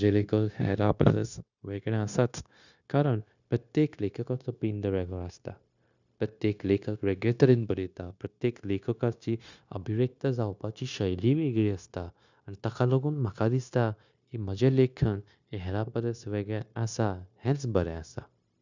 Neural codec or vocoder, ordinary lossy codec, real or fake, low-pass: codec, 16 kHz, 0.9 kbps, LongCat-Audio-Codec; none; fake; 7.2 kHz